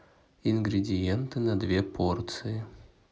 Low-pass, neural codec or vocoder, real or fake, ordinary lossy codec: none; none; real; none